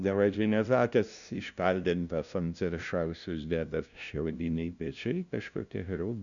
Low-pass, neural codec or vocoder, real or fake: 7.2 kHz; codec, 16 kHz, 0.5 kbps, FunCodec, trained on LibriTTS, 25 frames a second; fake